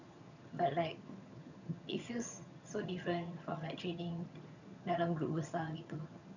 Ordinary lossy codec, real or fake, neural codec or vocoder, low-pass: none; fake; vocoder, 22.05 kHz, 80 mel bands, HiFi-GAN; 7.2 kHz